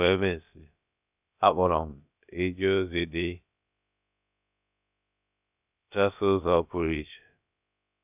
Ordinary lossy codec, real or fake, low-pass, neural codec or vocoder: none; fake; 3.6 kHz; codec, 16 kHz, about 1 kbps, DyCAST, with the encoder's durations